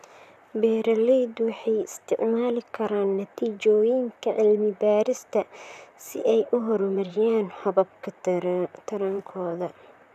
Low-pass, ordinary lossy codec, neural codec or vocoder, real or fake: 14.4 kHz; none; vocoder, 44.1 kHz, 128 mel bands, Pupu-Vocoder; fake